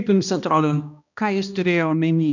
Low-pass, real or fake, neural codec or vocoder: 7.2 kHz; fake; codec, 16 kHz, 1 kbps, X-Codec, HuBERT features, trained on balanced general audio